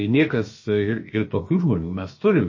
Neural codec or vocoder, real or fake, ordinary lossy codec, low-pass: codec, 16 kHz, about 1 kbps, DyCAST, with the encoder's durations; fake; MP3, 32 kbps; 7.2 kHz